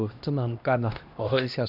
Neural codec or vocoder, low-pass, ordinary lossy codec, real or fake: codec, 16 kHz, 1 kbps, X-Codec, HuBERT features, trained on LibriSpeech; 5.4 kHz; none; fake